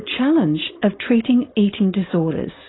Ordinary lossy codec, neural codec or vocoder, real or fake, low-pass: AAC, 16 kbps; codec, 16 kHz, 4.8 kbps, FACodec; fake; 7.2 kHz